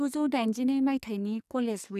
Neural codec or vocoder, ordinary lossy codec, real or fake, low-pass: codec, 44.1 kHz, 2.6 kbps, SNAC; none; fake; 14.4 kHz